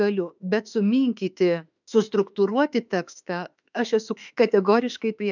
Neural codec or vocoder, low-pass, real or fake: autoencoder, 48 kHz, 32 numbers a frame, DAC-VAE, trained on Japanese speech; 7.2 kHz; fake